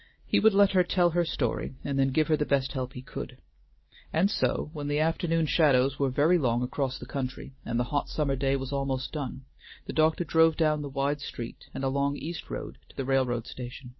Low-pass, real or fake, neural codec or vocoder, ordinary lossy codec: 7.2 kHz; real; none; MP3, 24 kbps